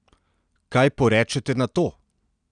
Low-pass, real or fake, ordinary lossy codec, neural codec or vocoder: 9.9 kHz; real; none; none